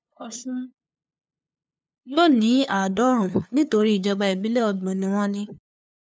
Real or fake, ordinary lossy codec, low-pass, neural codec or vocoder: fake; none; none; codec, 16 kHz, 2 kbps, FunCodec, trained on LibriTTS, 25 frames a second